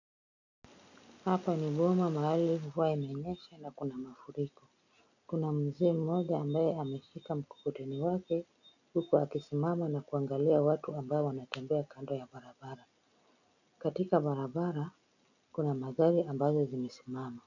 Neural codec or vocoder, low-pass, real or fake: none; 7.2 kHz; real